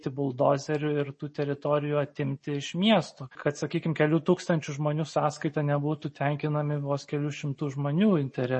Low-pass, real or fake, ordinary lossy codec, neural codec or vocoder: 10.8 kHz; real; MP3, 32 kbps; none